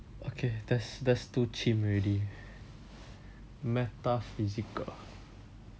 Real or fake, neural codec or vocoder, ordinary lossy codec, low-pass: real; none; none; none